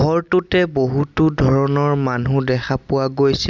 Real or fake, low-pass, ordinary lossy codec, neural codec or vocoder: real; 7.2 kHz; none; none